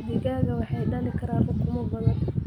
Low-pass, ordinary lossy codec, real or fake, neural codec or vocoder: 19.8 kHz; none; real; none